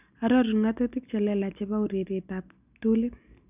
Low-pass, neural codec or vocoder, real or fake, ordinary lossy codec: 3.6 kHz; none; real; none